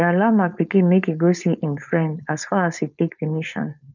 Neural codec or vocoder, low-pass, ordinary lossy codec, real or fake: codec, 16 kHz, 4.8 kbps, FACodec; 7.2 kHz; none; fake